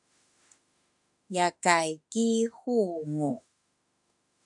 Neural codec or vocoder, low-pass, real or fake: autoencoder, 48 kHz, 32 numbers a frame, DAC-VAE, trained on Japanese speech; 10.8 kHz; fake